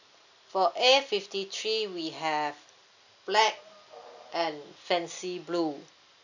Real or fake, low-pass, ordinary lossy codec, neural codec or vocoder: real; 7.2 kHz; none; none